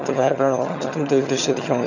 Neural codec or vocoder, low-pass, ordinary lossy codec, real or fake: vocoder, 22.05 kHz, 80 mel bands, HiFi-GAN; 7.2 kHz; none; fake